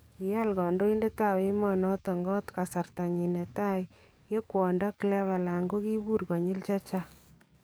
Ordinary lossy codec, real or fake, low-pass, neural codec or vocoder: none; fake; none; codec, 44.1 kHz, 7.8 kbps, DAC